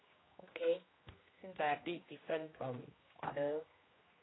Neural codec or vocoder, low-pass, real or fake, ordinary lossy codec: codec, 16 kHz, 1 kbps, X-Codec, HuBERT features, trained on general audio; 7.2 kHz; fake; AAC, 16 kbps